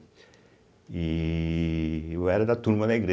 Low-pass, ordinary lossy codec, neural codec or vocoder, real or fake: none; none; none; real